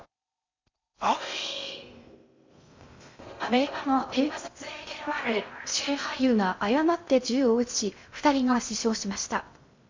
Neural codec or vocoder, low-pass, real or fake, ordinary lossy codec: codec, 16 kHz in and 24 kHz out, 0.6 kbps, FocalCodec, streaming, 2048 codes; 7.2 kHz; fake; MP3, 64 kbps